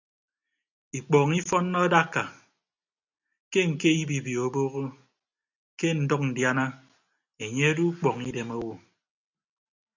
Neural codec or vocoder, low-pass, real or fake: none; 7.2 kHz; real